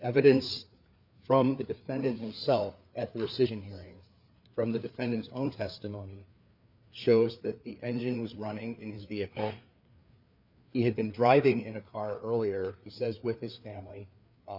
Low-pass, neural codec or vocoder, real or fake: 5.4 kHz; codec, 16 kHz, 4 kbps, FreqCodec, larger model; fake